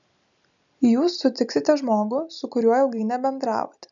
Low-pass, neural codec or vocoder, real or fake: 7.2 kHz; none; real